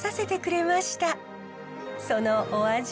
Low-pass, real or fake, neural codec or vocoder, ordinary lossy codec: none; real; none; none